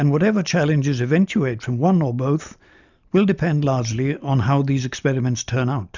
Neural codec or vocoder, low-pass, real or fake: none; 7.2 kHz; real